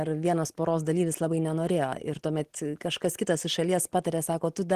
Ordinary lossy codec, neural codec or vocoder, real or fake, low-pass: Opus, 16 kbps; none; real; 14.4 kHz